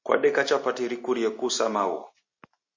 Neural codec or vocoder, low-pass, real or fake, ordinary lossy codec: none; 7.2 kHz; real; MP3, 32 kbps